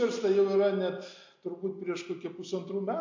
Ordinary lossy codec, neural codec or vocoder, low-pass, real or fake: MP3, 48 kbps; none; 7.2 kHz; real